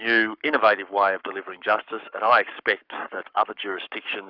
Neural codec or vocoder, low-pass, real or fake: codec, 44.1 kHz, 7.8 kbps, Pupu-Codec; 5.4 kHz; fake